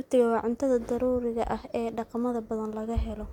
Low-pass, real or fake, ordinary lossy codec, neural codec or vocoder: 19.8 kHz; real; MP3, 96 kbps; none